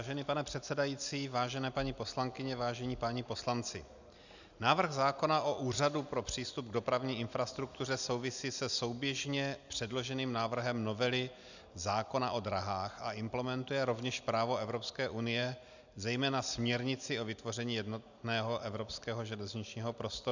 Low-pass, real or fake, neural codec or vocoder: 7.2 kHz; real; none